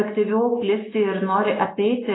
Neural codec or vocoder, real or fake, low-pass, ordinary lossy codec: none; real; 7.2 kHz; AAC, 16 kbps